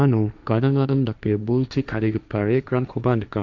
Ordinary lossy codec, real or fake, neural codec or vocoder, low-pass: none; fake; codec, 16 kHz, 1.1 kbps, Voila-Tokenizer; 7.2 kHz